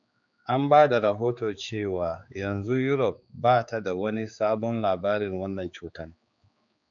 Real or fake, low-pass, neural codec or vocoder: fake; 7.2 kHz; codec, 16 kHz, 4 kbps, X-Codec, HuBERT features, trained on general audio